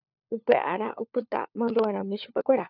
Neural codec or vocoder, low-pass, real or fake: codec, 16 kHz, 4 kbps, FunCodec, trained on LibriTTS, 50 frames a second; 5.4 kHz; fake